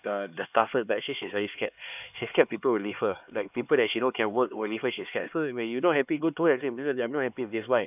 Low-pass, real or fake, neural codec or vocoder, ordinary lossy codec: 3.6 kHz; fake; codec, 16 kHz, 2 kbps, X-Codec, HuBERT features, trained on LibriSpeech; none